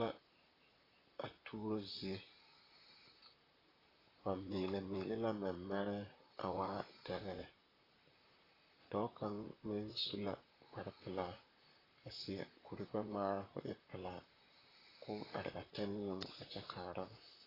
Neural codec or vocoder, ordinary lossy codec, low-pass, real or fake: vocoder, 44.1 kHz, 128 mel bands, Pupu-Vocoder; AAC, 24 kbps; 5.4 kHz; fake